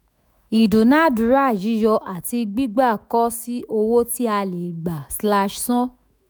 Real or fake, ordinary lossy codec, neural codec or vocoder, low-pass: fake; none; autoencoder, 48 kHz, 128 numbers a frame, DAC-VAE, trained on Japanese speech; none